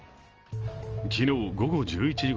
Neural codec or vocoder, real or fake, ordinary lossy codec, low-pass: none; real; Opus, 24 kbps; 7.2 kHz